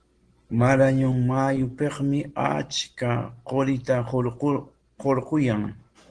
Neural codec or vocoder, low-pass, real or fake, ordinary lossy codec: none; 9.9 kHz; real; Opus, 16 kbps